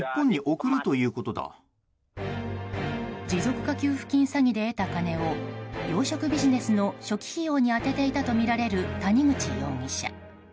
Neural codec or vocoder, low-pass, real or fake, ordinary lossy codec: none; none; real; none